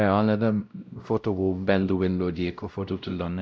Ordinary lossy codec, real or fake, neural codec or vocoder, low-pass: none; fake; codec, 16 kHz, 0.5 kbps, X-Codec, WavLM features, trained on Multilingual LibriSpeech; none